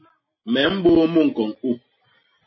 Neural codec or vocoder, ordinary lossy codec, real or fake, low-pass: none; MP3, 24 kbps; real; 7.2 kHz